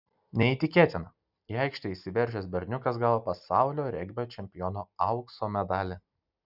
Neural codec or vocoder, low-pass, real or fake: none; 5.4 kHz; real